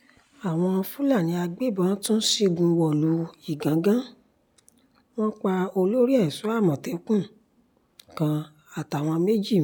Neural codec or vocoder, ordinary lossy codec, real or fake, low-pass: none; none; real; none